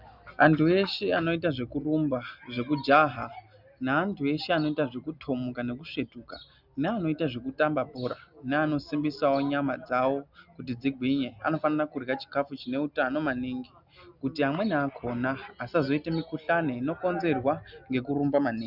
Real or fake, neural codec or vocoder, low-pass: real; none; 5.4 kHz